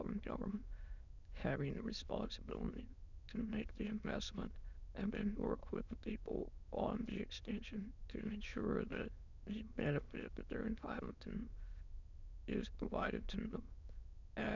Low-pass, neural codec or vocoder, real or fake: 7.2 kHz; autoencoder, 22.05 kHz, a latent of 192 numbers a frame, VITS, trained on many speakers; fake